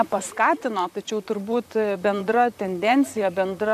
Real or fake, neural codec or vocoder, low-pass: fake; vocoder, 44.1 kHz, 128 mel bands, Pupu-Vocoder; 14.4 kHz